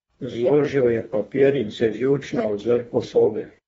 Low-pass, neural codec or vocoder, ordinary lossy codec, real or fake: 10.8 kHz; codec, 24 kHz, 1.5 kbps, HILCodec; AAC, 24 kbps; fake